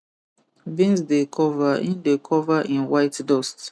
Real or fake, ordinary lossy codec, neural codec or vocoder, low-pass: real; none; none; none